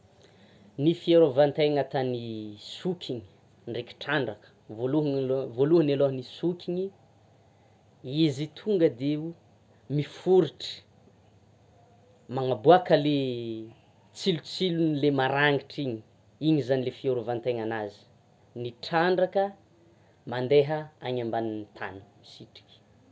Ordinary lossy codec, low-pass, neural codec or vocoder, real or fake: none; none; none; real